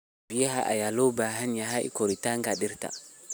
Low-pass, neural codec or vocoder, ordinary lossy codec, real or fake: none; none; none; real